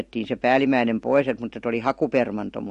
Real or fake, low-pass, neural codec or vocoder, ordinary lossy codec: real; 14.4 kHz; none; MP3, 48 kbps